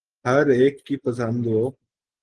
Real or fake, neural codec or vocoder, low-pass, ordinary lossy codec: real; none; 10.8 kHz; Opus, 16 kbps